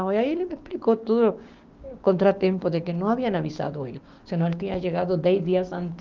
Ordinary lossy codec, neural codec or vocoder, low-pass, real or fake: Opus, 32 kbps; codec, 16 kHz, 6 kbps, DAC; 7.2 kHz; fake